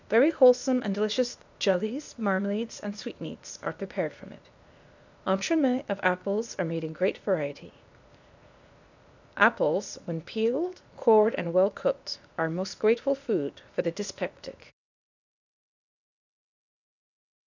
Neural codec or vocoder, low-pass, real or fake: codec, 16 kHz, 0.8 kbps, ZipCodec; 7.2 kHz; fake